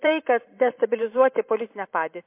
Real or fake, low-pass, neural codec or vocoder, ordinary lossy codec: fake; 3.6 kHz; vocoder, 44.1 kHz, 128 mel bands every 512 samples, BigVGAN v2; MP3, 24 kbps